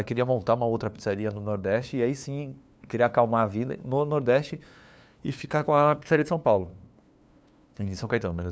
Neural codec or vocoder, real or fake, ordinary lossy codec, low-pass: codec, 16 kHz, 2 kbps, FunCodec, trained on LibriTTS, 25 frames a second; fake; none; none